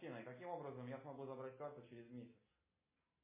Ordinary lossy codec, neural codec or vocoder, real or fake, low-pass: MP3, 16 kbps; none; real; 3.6 kHz